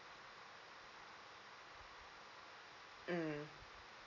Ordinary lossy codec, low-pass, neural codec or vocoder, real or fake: none; 7.2 kHz; none; real